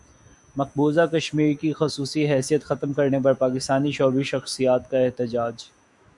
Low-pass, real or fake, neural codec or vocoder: 10.8 kHz; fake; autoencoder, 48 kHz, 128 numbers a frame, DAC-VAE, trained on Japanese speech